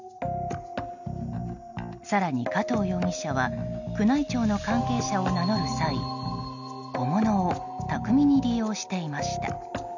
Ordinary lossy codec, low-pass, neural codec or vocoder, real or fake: none; 7.2 kHz; none; real